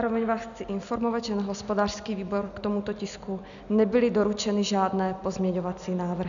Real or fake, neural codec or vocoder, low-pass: real; none; 7.2 kHz